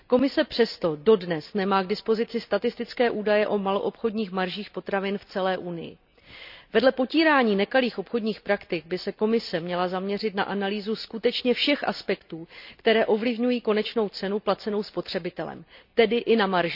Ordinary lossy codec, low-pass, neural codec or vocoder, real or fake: none; 5.4 kHz; none; real